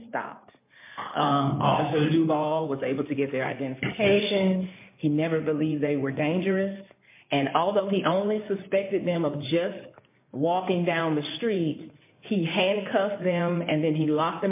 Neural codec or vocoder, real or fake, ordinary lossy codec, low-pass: codec, 16 kHz in and 24 kHz out, 2.2 kbps, FireRedTTS-2 codec; fake; MP3, 24 kbps; 3.6 kHz